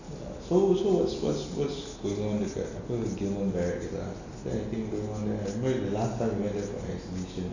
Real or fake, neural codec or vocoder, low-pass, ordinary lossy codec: real; none; 7.2 kHz; none